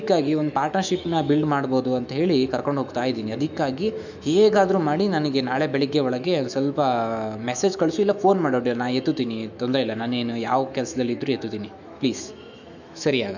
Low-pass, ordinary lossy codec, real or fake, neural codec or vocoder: 7.2 kHz; none; fake; autoencoder, 48 kHz, 128 numbers a frame, DAC-VAE, trained on Japanese speech